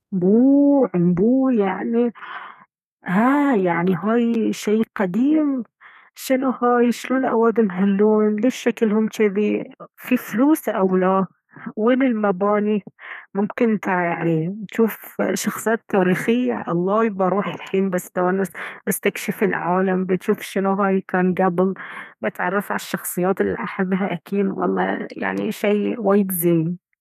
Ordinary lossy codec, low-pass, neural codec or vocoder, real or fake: none; 14.4 kHz; codec, 32 kHz, 1.9 kbps, SNAC; fake